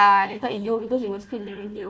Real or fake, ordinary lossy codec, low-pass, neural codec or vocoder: fake; none; none; codec, 16 kHz, 1 kbps, FunCodec, trained on Chinese and English, 50 frames a second